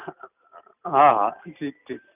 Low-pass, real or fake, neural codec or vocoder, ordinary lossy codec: 3.6 kHz; real; none; none